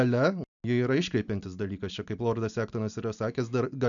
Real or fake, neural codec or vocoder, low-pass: real; none; 7.2 kHz